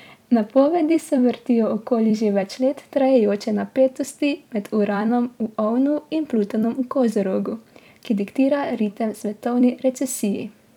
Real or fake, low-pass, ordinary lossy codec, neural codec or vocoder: fake; 19.8 kHz; none; vocoder, 44.1 kHz, 128 mel bands every 256 samples, BigVGAN v2